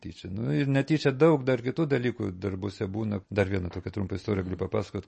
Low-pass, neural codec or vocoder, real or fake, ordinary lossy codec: 10.8 kHz; none; real; MP3, 32 kbps